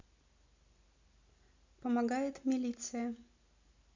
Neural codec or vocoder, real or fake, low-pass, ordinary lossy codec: none; real; 7.2 kHz; MP3, 64 kbps